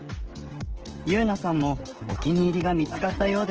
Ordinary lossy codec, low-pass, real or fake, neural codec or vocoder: Opus, 16 kbps; 7.2 kHz; fake; codec, 16 kHz, 16 kbps, FreqCodec, smaller model